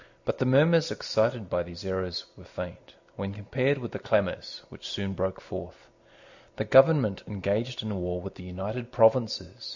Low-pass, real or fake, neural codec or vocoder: 7.2 kHz; real; none